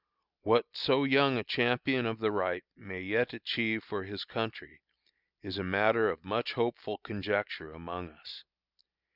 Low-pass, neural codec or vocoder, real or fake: 5.4 kHz; none; real